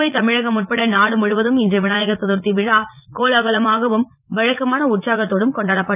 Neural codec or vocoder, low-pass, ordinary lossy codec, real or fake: vocoder, 44.1 kHz, 80 mel bands, Vocos; 3.6 kHz; none; fake